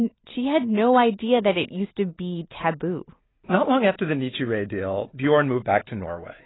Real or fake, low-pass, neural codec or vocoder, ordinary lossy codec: real; 7.2 kHz; none; AAC, 16 kbps